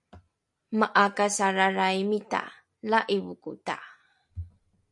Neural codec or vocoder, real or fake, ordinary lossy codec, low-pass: none; real; MP3, 64 kbps; 10.8 kHz